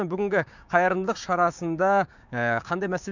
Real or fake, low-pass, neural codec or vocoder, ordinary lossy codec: fake; 7.2 kHz; codec, 16 kHz, 6 kbps, DAC; none